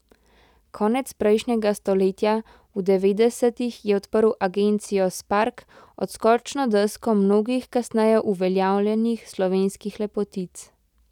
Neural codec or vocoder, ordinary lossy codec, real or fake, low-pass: none; none; real; 19.8 kHz